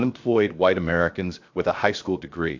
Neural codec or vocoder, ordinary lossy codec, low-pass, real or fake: codec, 16 kHz, 0.7 kbps, FocalCodec; MP3, 48 kbps; 7.2 kHz; fake